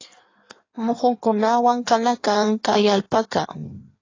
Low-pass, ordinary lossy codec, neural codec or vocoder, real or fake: 7.2 kHz; AAC, 32 kbps; codec, 16 kHz in and 24 kHz out, 1.1 kbps, FireRedTTS-2 codec; fake